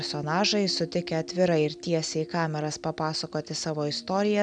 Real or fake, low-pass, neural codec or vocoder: real; 9.9 kHz; none